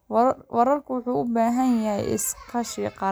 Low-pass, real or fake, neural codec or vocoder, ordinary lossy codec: none; real; none; none